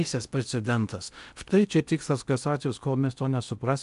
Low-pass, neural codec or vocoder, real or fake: 10.8 kHz; codec, 16 kHz in and 24 kHz out, 0.6 kbps, FocalCodec, streaming, 2048 codes; fake